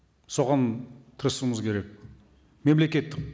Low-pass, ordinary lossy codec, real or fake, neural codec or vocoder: none; none; real; none